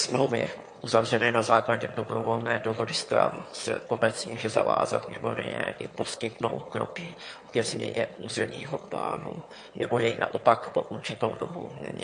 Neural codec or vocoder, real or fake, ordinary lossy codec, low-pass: autoencoder, 22.05 kHz, a latent of 192 numbers a frame, VITS, trained on one speaker; fake; MP3, 48 kbps; 9.9 kHz